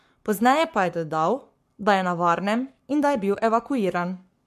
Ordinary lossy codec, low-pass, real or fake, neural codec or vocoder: MP3, 64 kbps; 14.4 kHz; fake; codec, 44.1 kHz, 7.8 kbps, Pupu-Codec